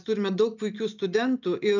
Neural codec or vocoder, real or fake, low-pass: none; real; 7.2 kHz